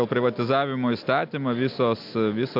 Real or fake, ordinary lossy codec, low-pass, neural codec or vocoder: real; MP3, 48 kbps; 5.4 kHz; none